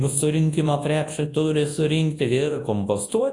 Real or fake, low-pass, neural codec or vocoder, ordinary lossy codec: fake; 10.8 kHz; codec, 24 kHz, 0.9 kbps, WavTokenizer, large speech release; AAC, 48 kbps